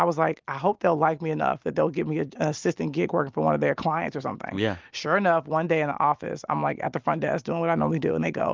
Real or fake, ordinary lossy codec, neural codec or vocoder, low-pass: real; Opus, 32 kbps; none; 7.2 kHz